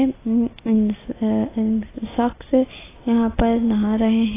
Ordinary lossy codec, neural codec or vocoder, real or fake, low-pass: AAC, 16 kbps; none; real; 3.6 kHz